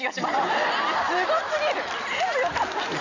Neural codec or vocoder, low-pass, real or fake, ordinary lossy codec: none; 7.2 kHz; real; none